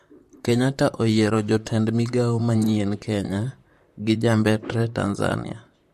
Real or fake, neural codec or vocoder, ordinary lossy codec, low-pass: fake; vocoder, 44.1 kHz, 128 mel bands, Pupu-Vocoder; MP3, 64 kbps; 14.4 kHz